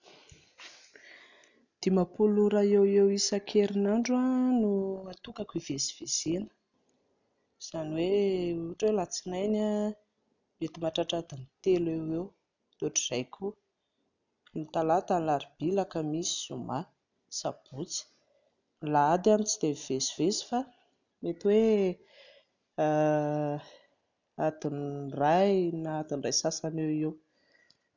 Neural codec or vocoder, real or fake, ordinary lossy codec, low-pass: none; real; none; 7.2 kHz